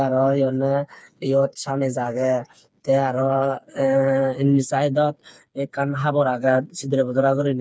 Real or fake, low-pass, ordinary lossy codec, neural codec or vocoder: fake; none; none; codec, 16 kHz, 4 kbps, FreqCodec, smaller model